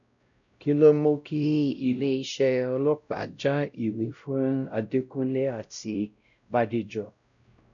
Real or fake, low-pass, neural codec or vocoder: fake; 7.2 kHz; codec, 16 kHz, 0.5 kbps, X-Codec, WavLM features, trained on Multilingual LibriSpeech